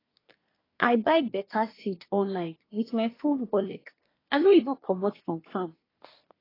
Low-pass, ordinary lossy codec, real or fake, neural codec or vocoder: 5.4 kHz; AAC, 24 kbps; fake; codec, 24 kHz, 1 kbps, SNAC